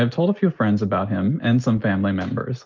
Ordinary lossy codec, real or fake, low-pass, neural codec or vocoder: Opus, 24 kbps; real; 7.2 kHz; none